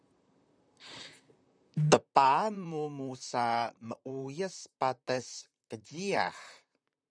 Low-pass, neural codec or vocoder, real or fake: 9.9 kHz; vocoder, 44.1 kHz, 128 mel bands, Pupu-Vocoder; fake